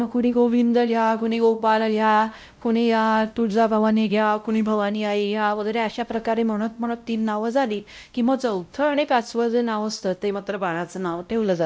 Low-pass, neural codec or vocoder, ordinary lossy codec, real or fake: none; codec, 16 kHz, 0.5 kbps, X-Codec, WavLM features, trained on Multilingual LibriSpeech; none; fake